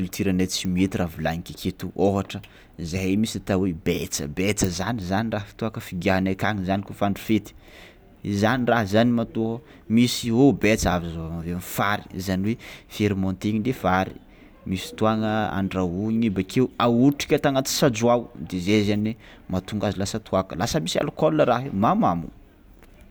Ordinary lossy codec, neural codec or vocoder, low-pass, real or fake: none; none; none; real